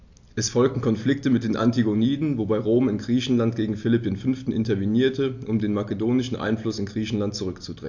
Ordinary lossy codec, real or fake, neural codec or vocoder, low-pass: AAC, 48 kbps; real; none; 7.2 kHz